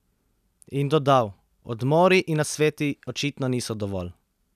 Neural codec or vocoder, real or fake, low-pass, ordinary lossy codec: none; real; 14.4 kHz; none